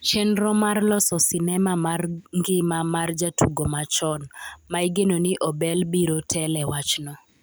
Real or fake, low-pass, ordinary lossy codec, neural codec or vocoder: real; none; none; none